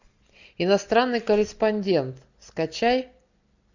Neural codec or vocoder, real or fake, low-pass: none; real; 7.2 kHz